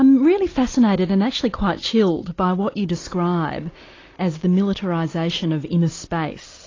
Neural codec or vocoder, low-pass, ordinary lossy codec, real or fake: none; 7.2 kHz; AAC, 32 kbps; real